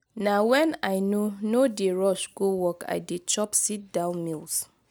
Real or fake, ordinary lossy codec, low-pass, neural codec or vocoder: real; none; none; none